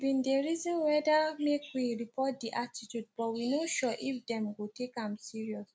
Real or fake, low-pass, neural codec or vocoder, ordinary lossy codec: real; none; none; none